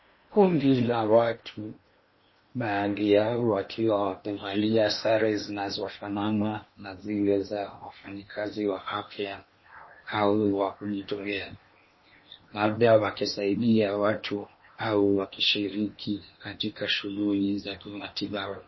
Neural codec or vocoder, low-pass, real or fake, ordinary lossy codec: codec, 16 kHz in and 24 kHz out, 0.8 kbps, FocalCodec, streaming, 65536 codes; 7.2 kHz; fake; MP3, 24 kbps